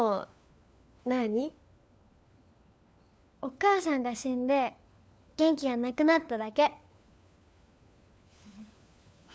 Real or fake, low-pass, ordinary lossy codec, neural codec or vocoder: fake; none; none; codec, 16 kHz, 4 kbps, FunCodec, trained on LibriTTS, 50 frames a second